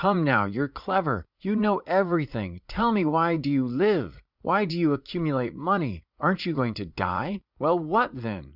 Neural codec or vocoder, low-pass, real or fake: vocoder, 44.1 kHz, 80 mel bands, Vocos; 5.4 kHz; fake